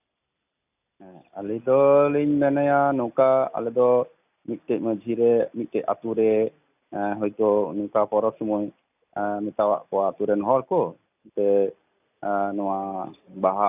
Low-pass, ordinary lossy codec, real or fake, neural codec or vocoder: 3.6 kHz; none; real; none